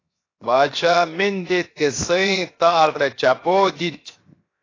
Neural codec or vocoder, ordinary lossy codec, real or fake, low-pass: codec, 16 kHz, 0.7 kbps, FocalCodec; AAC, 32 kbps; fake; 7.2 kHz